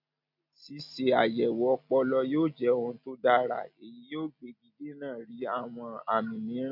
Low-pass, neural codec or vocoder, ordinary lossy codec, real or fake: 5.4 kHz; none; none; real